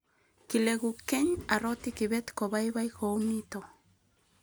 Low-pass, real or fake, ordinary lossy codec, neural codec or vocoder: none; real; none; none